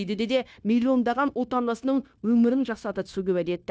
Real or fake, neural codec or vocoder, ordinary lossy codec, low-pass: fake; codec, 16 kHz, 0.9 kbps, LongCat-Audio-Codec; none; none